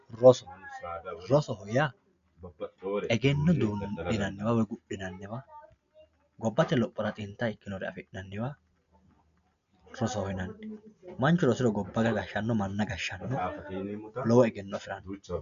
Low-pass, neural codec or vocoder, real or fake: 7.2 kHz; none; real